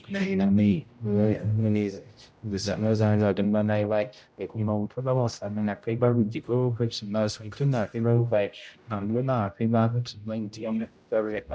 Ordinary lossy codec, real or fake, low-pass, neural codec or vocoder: none; fake; none; codec, 16 kHz, 0.5 kbps, X-Codec, HuBERT features, trained on general audio